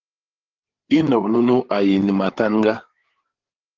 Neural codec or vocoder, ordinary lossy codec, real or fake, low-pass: codec, 16 kHz, 8 kbps, FreqCodec, larger model; Opus, 16 kbps; fake; 7.2 kHz